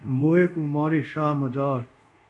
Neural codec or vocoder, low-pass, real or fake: codec, 24 kHz, 0.5 kbps, DualCodec; 10.8 kHz; fake